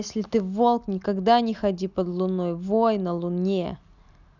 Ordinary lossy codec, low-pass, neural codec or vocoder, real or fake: none; 7.2 kHz; none; real